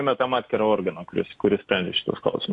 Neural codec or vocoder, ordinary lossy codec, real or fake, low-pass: none; AAC, 48 kbps; real; 10.8 kHz